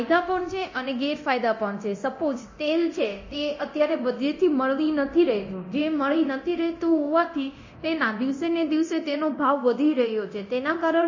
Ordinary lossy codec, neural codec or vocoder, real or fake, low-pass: MP3, 32 kbps; codec, 24 kHz, 0.9 kbps, DualCodec; fake; 7.2 kHz